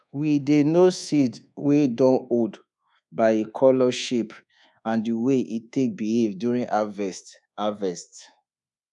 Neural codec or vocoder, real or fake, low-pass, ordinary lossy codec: codec, 24 kHz, 1.2 kbps, DualCodec; fake; 10.8 kHz; none